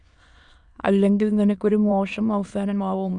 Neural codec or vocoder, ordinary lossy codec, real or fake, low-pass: autoencoder, 22.05 kHz, a latent of 192 numbers a frame, VITS, trained on many speakers; Opus, 64 kbps; fake; 9.9 kHz